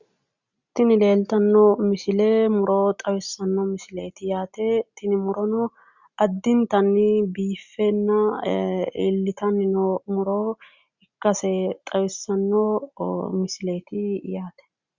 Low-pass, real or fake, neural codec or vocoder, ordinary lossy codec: 7.2 kHz; real; none; Opus, 64 kbps